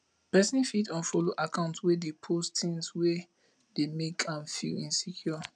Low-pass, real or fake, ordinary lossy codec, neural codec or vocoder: 9.9 kHz; real; none; none